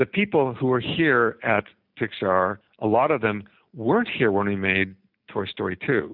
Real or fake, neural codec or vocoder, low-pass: real; none; 5.4 kHz